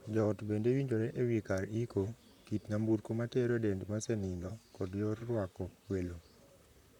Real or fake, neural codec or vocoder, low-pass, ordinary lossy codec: fake; vocoder, 44.1 kHz, 128 mel bands, Pupu-Vocoder; 19.8 kHz; none